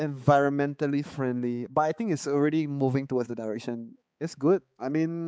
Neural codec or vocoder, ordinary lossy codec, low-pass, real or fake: codec, 16 kHz, 4 kbps, X-Codec, HuBERT features, trained on balanced general audio; none; none; fake